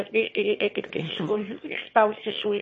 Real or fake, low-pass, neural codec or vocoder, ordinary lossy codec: fake; 9.9 kHz; autoencoder, 22.05 kHz, a latent of 192 numbers a frame, VITS, trained on one speaker; MP3, 32 kbps